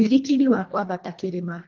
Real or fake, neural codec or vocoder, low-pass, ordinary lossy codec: fake; codec, 24 kHz, 1.5 kbps, HILCodec; 7.2 kHz; Opus, 32 kbps